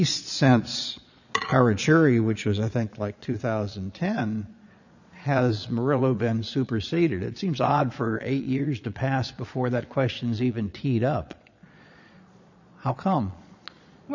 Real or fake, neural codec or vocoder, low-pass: fake; vocoder, 22.05 kHz, 80 mel bands, Vocos; 7.2 kHz